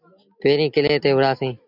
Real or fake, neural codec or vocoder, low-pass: real; none; 5.4 kHz